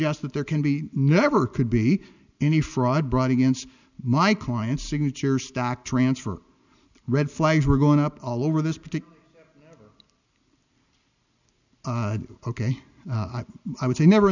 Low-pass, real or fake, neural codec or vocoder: 7.2 kHz; real; none